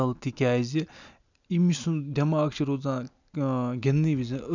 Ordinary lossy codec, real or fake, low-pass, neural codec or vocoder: none; real; 7.2 kHz; none